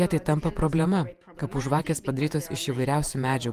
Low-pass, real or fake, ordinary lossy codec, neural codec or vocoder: 14.4 kHz; fake; Opus, 32 kbps; vocoder, 48 kHz, 128 mel bands, Vocos